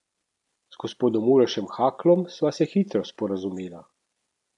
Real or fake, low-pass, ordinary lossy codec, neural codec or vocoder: real; 10.8 kHz; none; none